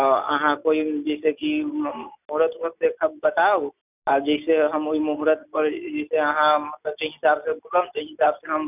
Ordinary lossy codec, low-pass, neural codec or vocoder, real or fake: none; 3.6 kHz; none; real